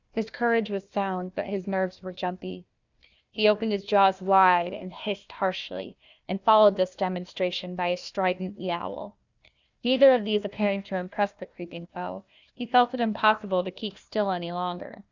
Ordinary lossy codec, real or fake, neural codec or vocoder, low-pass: Opus, 64 kbps; fake; codec, 16 kHz, 1 kbps, FunCodec, trained on Chinese and English, 50 frames a second; 7.2 kHz